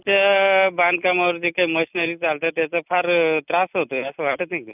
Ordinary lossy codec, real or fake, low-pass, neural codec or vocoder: none; real; 3.6 kHz; none